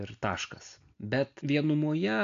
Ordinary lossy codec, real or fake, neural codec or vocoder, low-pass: AAC, 64 kbps; real; none; 7.2 kHz